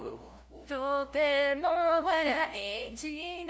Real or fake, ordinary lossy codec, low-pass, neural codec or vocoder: fake; none; none; codec, 16 kHz, 0.5 kbps, FunCodec, trained on LibriTTS, 25 frames a second